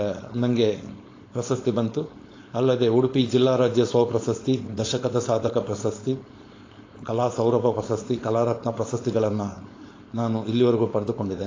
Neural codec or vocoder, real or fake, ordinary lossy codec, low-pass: codec, 16 kHz, 4.8 kbps, FACodec; fake; AAC, 32 kbps; 7.2 kHz